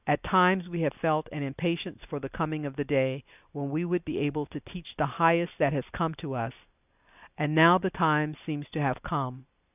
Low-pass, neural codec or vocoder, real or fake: 3.6 kHz; none; real